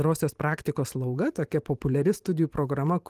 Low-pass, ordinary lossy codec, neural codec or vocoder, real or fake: 14.4 kHz; Opus, 24 kbps; vocoder, 44.1 kHz, 128 mel bands every 512 samples, BigVGAN v2; fake